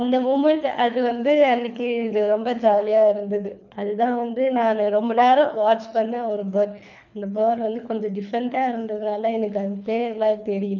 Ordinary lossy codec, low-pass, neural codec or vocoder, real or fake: none; 7.2 kHz; codec, 24 kHz, 3 kbps, HILCodec; fake